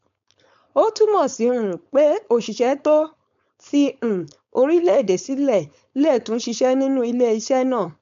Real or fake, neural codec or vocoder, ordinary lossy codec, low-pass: fake; codec, 16 kHz, 4.8 kbps, FACodec; MP3, 64 kbps; 7.2 kHz